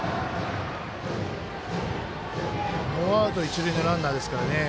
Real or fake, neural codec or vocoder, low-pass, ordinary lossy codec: real; none; none; none